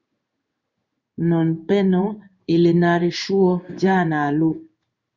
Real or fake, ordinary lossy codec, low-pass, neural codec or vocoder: fake; Opus, 64 kbps; 7.2 kHz; codec, 16 kHz in and 24 kHz out, 1 kbps, XY-Tokenizer